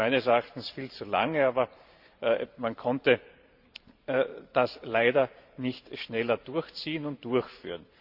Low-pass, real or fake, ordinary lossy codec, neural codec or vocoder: 5.4 kHz; fake; Opus, 64 kbps; vocoder, 44.1 kHz, 128 mel bands every 512 samples, BigVGAN v2